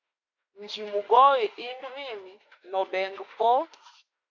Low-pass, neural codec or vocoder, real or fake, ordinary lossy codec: 7.2 kHz; autoencoder, 48 kHz, 32 numbers a frame, DAC-VAE, trained on Japanese speech; fake; MP3, 48 kbps